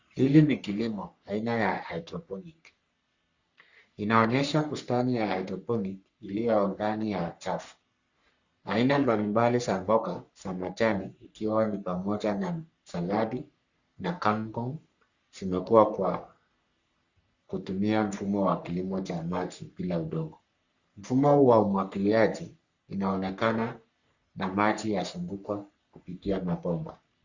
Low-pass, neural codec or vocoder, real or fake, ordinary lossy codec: 7.2 kHz; codec, 44.1 kHz, 3.4 kbps, Pupu-Codec; fake; Opus, 64 kbps